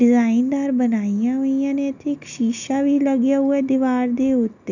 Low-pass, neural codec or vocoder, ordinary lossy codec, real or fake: 7.2 kHz; none; none; real